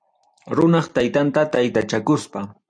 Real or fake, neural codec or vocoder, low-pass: real; none; 9.9 kHz